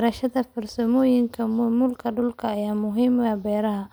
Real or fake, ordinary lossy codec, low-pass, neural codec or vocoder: real; none; none; none